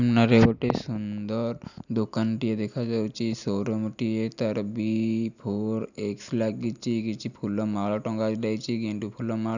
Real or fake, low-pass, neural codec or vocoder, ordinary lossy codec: real; 7.2 kHz; none; none